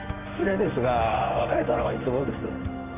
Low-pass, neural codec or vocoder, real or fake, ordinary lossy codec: 3.6 kHz; codec, 16 kHz in and 24 kHz out, 1 kbps, XY-Tokenizer; fake; none